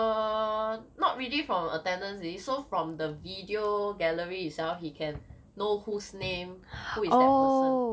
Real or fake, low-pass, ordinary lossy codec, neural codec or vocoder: real; none; none; none